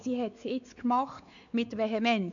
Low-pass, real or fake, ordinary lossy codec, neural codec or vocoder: 7.2 kHz; fake; none; codec, 16 kHz, 4 kbps, X-Codec, WavLM features, trained on Multilingual LibriSpeech